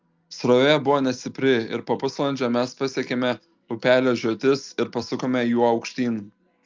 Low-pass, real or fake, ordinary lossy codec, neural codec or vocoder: 7.2 kHz; real; Opus, 24 kbps; none